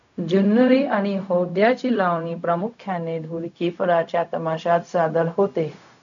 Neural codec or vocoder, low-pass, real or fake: codec, 16 kHz, 0.4 kbps, LongCat-Audio-Codec; 7.2 kHz; fake